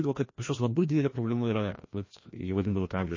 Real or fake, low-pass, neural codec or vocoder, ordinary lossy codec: fake; 7.2 kHz; codec, 16 kHz, 1 kbps, FreqCodec, larger model; MP3, 32 kbps